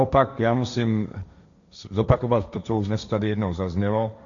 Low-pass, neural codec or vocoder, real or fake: 7.2 kHz; codec, 16 kHz, 1.1 kbps, Voila-Tokenizer; fake